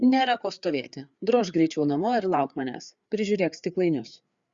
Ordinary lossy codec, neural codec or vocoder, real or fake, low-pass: Opus, 64 kbps; codec, 16 kHz, 4 kbps, FreqCodec, larger model; fake; 7.2 kHz